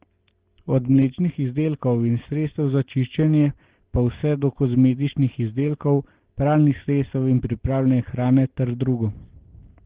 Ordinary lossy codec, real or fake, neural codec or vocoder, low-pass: Opus, 16 kbps; real; none; 3.6 kHz